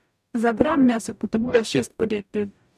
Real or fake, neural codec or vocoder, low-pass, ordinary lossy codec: fake; codec, 44.1 kHz, 0.9 kbps, DAC; 14.4 kHz; none